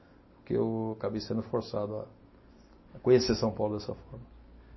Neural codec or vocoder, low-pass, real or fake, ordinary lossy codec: none; 7.2 kHz; real; MP3, 24 kbps